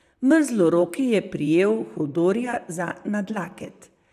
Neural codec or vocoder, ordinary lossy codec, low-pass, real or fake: vocoder, 44.1 kHz, 128 mel bands, Pupu-Vocoder; none; 14.4 kHz; fake